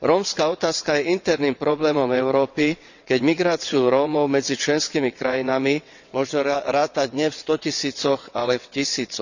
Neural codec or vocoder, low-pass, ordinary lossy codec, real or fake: vocoder, 22.05 kHz, 80 mel bands, WaveNeXt; 7.2 kHz; none; fake